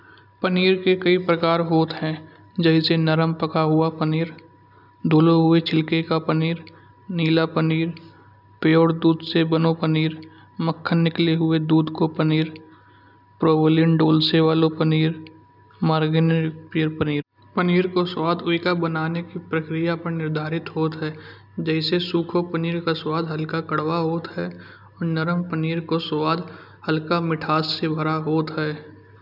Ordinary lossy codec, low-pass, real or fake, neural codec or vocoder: none; 5.4 kHz; real; none